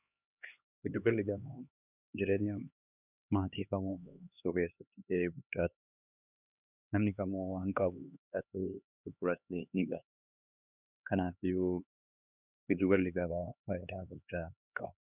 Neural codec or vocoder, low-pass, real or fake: codec, 16 kHz, 2 kbps, X-Codec, HuBERT features, trained on LibriSpeech; 3.6 kHz; fake